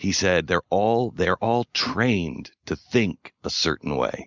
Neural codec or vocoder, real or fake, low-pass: none; real; 7.2 kHz